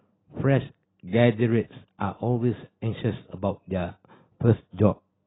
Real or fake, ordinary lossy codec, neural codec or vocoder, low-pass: real; AAC, 16 kbps; none; 7.2 kHz